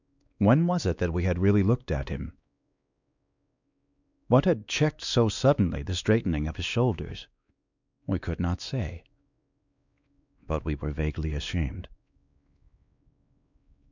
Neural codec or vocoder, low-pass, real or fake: codec, 16 kHz, 2 kbps, X-Codec, WavLM features, trained on Multilingual LibriSpeech; 7.2 kHz; fake